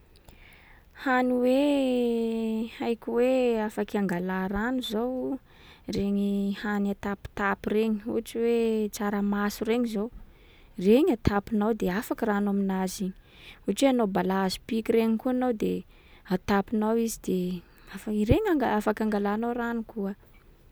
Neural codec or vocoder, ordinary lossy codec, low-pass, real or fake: none; none; none; real